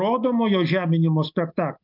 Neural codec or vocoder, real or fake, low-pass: none; real; 5.4 kHz